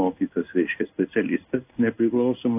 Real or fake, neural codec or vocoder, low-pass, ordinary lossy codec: fake; codec, 16 kHz in and 24 kHz out, 1 kbps, XY-Tokenizer; 3.6 kHz; AAC, 32 kbps